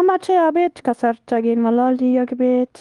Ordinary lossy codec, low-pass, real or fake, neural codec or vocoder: Opus, 24 kbps; 10.8 kHz; fake; codec, 24 kHz, 1.2 kbps, DualCodec